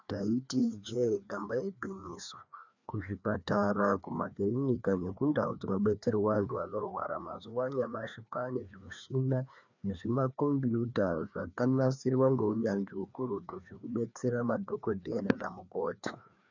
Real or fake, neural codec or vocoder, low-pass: fake; codec, 16 kHz, 2 kbps, FreqCodec, larger model; 7.2 kHz